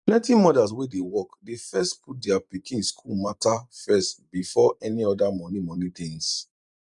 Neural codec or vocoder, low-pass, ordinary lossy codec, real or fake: vocoder, 44.1 kHz, 128 mel bands every 512 samples, BigVGAN v2; 10.8 kHz; none; fake